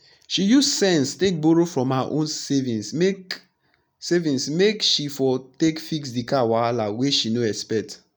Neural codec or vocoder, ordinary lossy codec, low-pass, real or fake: none; none; none; real